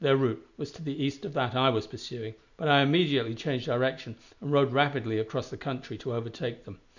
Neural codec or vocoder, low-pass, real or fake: none; 7.2 kHz; real